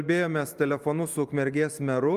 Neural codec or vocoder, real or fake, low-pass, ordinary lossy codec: vocoder, 44.1 kHz, 128 mel bands every 256 samples, BigVGAN v2; fake; 14.4 kHz; Opus, 32 kbps